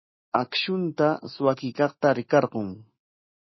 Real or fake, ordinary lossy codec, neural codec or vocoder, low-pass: fake; MP3, 24 kbps; autoencoder, 48 kHz, 128 numbers a frame, DAC-VAE, trained on Japanese speech; 7.2 kHz